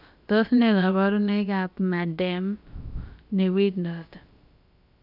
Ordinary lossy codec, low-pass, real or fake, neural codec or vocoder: none; 5.4 kHz; fake; codec, 16 kHz, about 1 kbps, DyCAST, with the encoder's durations